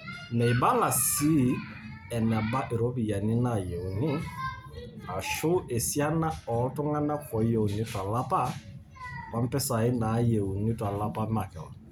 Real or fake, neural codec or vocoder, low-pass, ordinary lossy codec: real; none; none; none